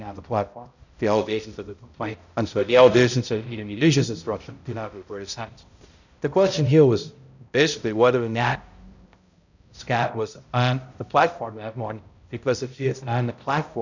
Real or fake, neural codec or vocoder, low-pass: fake; codec, 16 kHz, 0.5 kbps, X-Codec, HuBERT features, trained on balanced general audio; 7.2 kHz